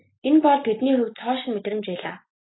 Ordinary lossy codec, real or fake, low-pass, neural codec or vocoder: AAC, 16 kbps; real; 7.2 kHz; none